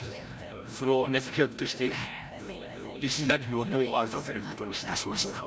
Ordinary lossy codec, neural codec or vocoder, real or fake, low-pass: none; codec, 16 kHz, 0.5 kbps, FreqCodec, larger model; fake; none